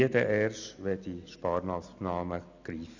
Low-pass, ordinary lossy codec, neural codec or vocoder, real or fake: 7.2 kHz; none; none; real